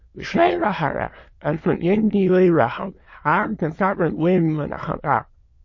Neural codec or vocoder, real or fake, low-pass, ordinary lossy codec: autoencoder, 22.05 kHz, a latent of 192 numbers a frame, VITS, trained on many speakers; fake; 7.2 kHz; MP3, 32 kbps